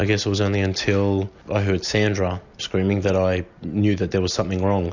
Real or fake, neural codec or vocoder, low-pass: real; none; 7.2 kHz